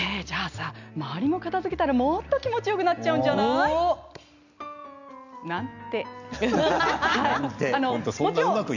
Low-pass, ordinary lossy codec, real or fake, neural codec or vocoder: 7.2 kHz; none; real; none